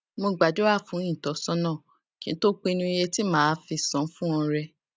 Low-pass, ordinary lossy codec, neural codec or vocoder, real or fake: none; none; none; real